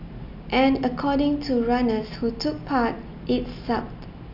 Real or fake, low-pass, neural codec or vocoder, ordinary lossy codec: real; 5.4 kHz; none; none